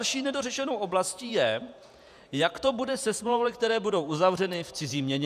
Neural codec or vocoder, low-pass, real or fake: vocoder, 48 kHz, 128 mel bands, Vocos; 14.4 kHz; fake